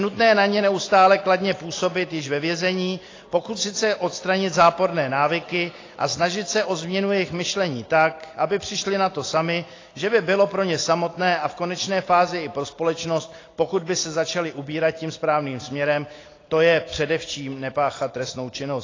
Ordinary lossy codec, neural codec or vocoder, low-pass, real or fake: AAC, 32 kbps; none; 7.2 kHz; real